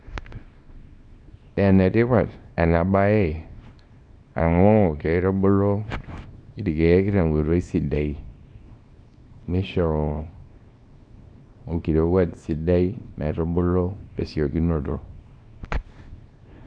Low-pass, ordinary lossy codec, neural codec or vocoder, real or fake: 9.9 kHz; none; codec, 24 kHz, 0.9 kbps, WavTokenizer, small release; fake